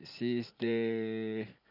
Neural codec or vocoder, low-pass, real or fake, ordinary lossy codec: vocoder, 44.1 kHz, 128 mel bands every 512 samples, BigVGAN v2; 5.4 kHz; fake; none